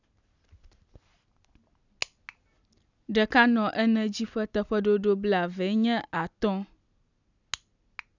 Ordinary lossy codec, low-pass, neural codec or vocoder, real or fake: none; 7.2 kHz; none; real